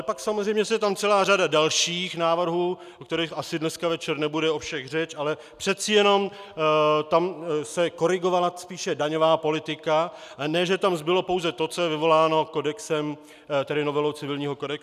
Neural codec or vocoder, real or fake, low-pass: none; real; 14.4 kHz